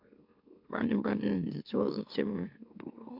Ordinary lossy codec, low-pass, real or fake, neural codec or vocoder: MP3, 48 kbps; 5.4 kHz; fake; autoencoder, 44.1 kHz, a latent of 192 numbers a frame, MeloTTS